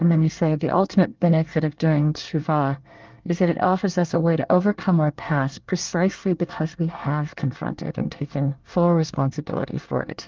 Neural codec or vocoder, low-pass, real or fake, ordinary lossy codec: codec, 24 kHz, 1 kbps, SNAC; 7.2 kHz; fake; Opus, 32 kbps